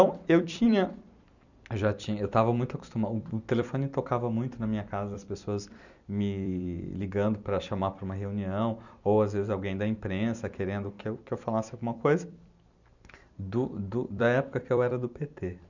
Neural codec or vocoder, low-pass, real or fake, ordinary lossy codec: vocoder, 44.1 kHz, 128 mel bands every 256 samples, BigVGAN v2; 7.2 kHz; fake; none